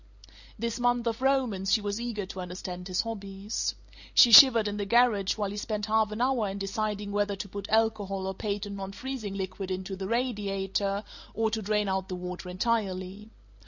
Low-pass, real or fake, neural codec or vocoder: 7.2 kHz; real; none